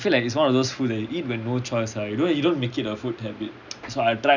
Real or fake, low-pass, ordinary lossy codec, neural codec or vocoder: real; 7.2 kHz; none; none